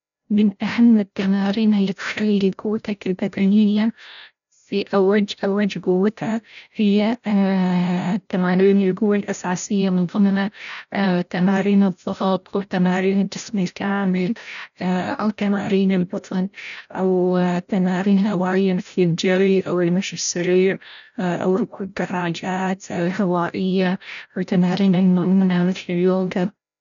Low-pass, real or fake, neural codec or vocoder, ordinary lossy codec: 7.2 kHz; fake; codec, 16 kHz, 0.5 kbps, FreqCodec, larger model; none